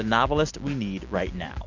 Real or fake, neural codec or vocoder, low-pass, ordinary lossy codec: real; none; 7.2 kHz; Opus, 64 kbps